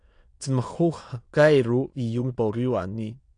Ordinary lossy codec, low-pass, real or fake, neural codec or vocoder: AAC, 48 kbps; 9.9 kHz; fake; autoencoder, 22.05 kHz, a latent of 192 numbers a frame, VITS, trained on many speakers